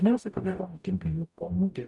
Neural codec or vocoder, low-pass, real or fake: codec, 44.1 kHz, 0.9 kbps, DAC; 10.8 kHz; fake